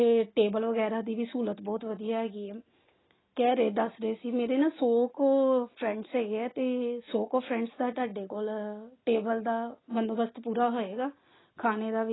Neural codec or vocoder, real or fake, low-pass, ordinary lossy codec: none; real; 7.2 kHz; AAC, 16 kbps